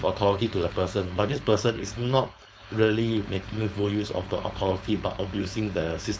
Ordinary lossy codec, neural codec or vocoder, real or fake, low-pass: none; codec, 16 kHz, 4.8 kbps, FACodec; fake; none